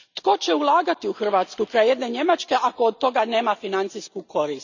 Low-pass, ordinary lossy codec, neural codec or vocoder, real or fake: 7.2 kHz; none; none; real